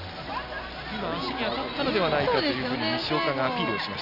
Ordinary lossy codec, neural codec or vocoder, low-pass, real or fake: none; none; 5.4 kHz; real